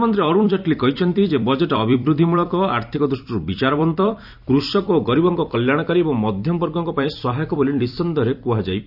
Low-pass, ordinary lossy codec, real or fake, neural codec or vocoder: 5.4 kHz; none; fake; vocoder, 44.1 kHz, 128 mel bands every 256 samples, BigVGAN v2